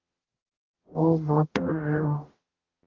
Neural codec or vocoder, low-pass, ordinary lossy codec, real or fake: codec, 44.1 kHz, 0.9 kbps, DAC; 7.2 kHz; Opus, 16 kbps; fake